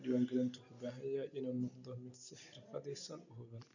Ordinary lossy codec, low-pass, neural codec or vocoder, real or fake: none; 7.2 kHz; none; real